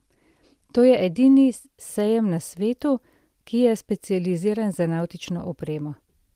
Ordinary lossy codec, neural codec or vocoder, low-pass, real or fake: Opus, 24 kbps; none; 14.4 kHz; real